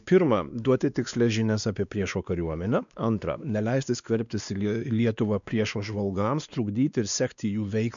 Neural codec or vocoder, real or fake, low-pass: codec, 16 kHz, 2 kbps, X-Codec, WavLM features, trained on Multilingual LibriSpeech; fake; 7.2 kHz